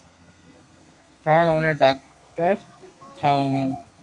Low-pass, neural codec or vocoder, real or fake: 10.8 kHz; codec, 32 kHz, 1.9 kbps, SNAC; fake